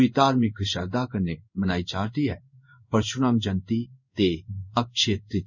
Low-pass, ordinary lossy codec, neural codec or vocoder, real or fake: 7.2 kHz; none; codec, 16 kHz in and 24 kHz out, 1 kbps, XY-Tokenizer; fake